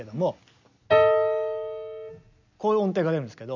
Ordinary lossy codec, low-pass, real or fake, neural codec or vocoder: none; 7.2 kHz; real; none